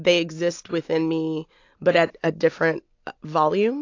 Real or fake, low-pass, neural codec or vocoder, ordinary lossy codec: real; 7.2 kHz; none; AAC, 48 kbps